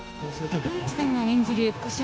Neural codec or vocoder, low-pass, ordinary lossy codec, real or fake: codec, 16 kHz, 0.9 kbps, LongCat-Audio-Codec; none; none; fake